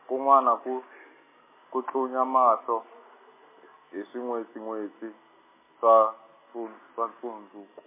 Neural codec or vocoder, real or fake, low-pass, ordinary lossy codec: none; real; 3.6 kHz; MP3, 16 kbps